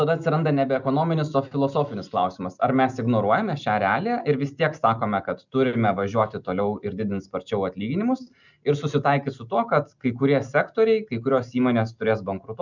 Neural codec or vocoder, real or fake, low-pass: none; real; 7.2 kHz